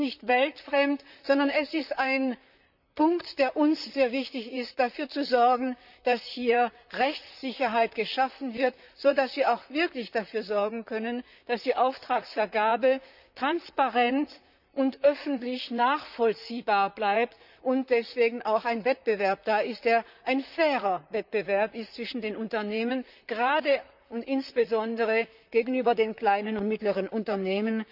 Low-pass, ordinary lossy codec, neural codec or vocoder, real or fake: 5.4 kHz; none; vocoder, 44.1 kHz, 128 mel bands, Pupu-Vocoder; fake